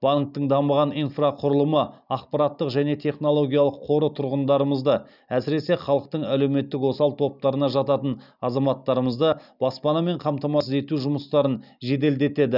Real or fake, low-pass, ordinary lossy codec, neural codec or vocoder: real; 5.4 kHz; none; none